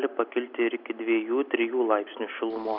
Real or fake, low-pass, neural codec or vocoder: real; 5.4 kHz; none